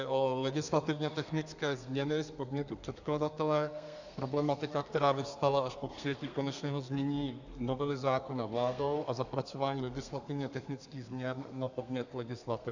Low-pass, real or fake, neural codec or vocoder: 7.2 kHz; fake; codec, 32 kHz, 1.9 kbps, SNAC